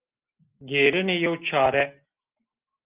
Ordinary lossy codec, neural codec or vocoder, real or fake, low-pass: Opus, 32 kbps; none; real; 3.6 kHz